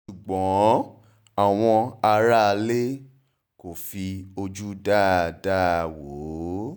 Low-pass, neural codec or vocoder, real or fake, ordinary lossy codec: none; none; real; none